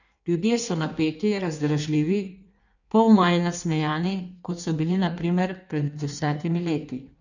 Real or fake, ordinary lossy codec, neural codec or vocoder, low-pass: fake; none; codec, 16 kHz in and 24 kHz out, 1.1 kbps, FireRedTTS-2 codec; 7.2 kHz